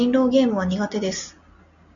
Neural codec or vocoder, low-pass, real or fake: none; 7.2 kHz; real